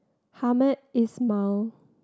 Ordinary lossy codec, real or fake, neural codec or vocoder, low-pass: none; real; none; none